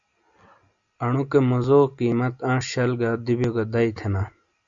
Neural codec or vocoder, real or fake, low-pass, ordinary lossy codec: none; real; 7.2 kHz; Opus, 64 kbps